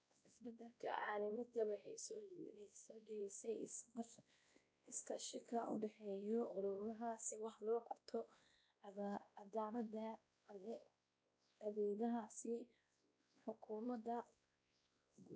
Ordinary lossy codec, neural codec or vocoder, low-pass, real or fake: none; codec, 16 kHz, 1 kbps, X-Codec, WavLM features, trained on Multilingual LibriSpeech; none; fake